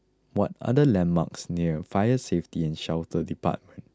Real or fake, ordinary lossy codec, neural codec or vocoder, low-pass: real; none; none; none